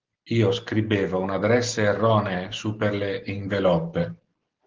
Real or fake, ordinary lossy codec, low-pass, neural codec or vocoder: real; Opus, 16 kbps; 7.2 kHz; none